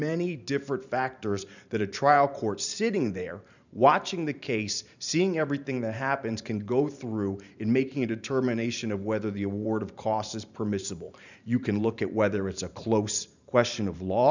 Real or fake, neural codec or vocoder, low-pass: fake; vocoder, 44.1 kHz, 128 mel bands every 512 samples, BigVGAN v2; 7.2 kHz